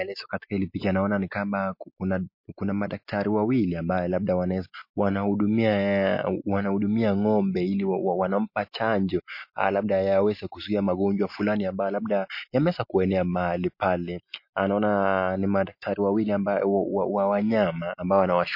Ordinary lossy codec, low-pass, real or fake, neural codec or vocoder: MP3, 32 kbps; 5.4 kHz; real; none